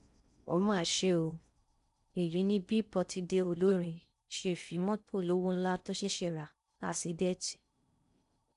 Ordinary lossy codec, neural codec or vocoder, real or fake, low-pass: none; codec, 16 kHz in and 24 kHz out, 0.6 kbps, FocalCodec, streaming, 4096 codes; fake; 10.8 kHz